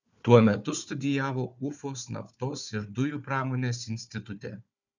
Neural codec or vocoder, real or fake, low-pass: codec, 16 kHz, 4 kbps, FunCodec, trained on Chinese and English, 50 frames a second; fake; 7.2 kHz